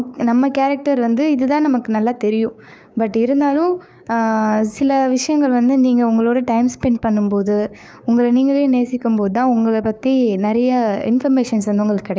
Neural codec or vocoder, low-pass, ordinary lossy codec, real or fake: codec, 16 kHz, 6 kbps, DAC; none; none; fake